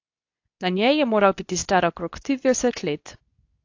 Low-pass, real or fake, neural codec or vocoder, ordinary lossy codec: 7.2 kHz; fake; codec, 24 kHz, 0.9 kbps, WavTokenizer, medium speech release version 2; AAC, 48 kbps